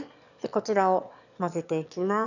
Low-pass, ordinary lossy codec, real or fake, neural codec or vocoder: 7.2 kHz; none; fake; autoencoder, 22.05 kHz, a latent of 192 numbers a frame, VITS, trained on one speaker